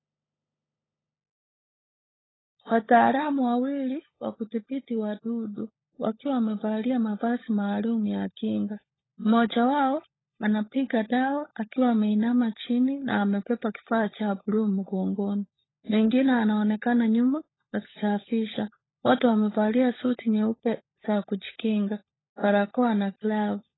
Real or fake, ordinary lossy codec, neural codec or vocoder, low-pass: fake; AAC, 16 kbps; codec, 16 kHz, 16 kbps, FunCodec, trained on LibriTTS, 50 frames a second; 7.2 kHz